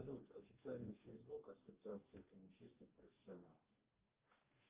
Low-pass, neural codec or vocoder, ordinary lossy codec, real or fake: 3.6 kHz; codec, 44.1 kHz, 2.6 kbps, DAC; Opus, 32 kbps; fake